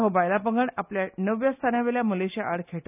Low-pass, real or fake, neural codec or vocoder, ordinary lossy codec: 3.6 kHz; real; none; none